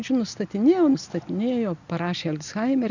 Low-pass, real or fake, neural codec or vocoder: 7.2 kHz; real; none